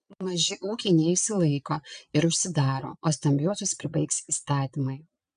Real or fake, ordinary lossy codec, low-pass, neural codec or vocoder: fake; MP3, 96 kbps; 9.9 kHz; vocoder, 22.05 kHz, 80 mel bands, Vocos